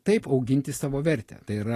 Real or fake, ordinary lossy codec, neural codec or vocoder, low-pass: real; AAC, 48 kbps; none; 14.4 kHz